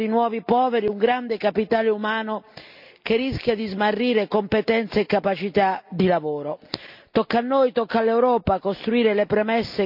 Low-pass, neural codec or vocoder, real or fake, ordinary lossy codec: 5.4 kHz; none; real; none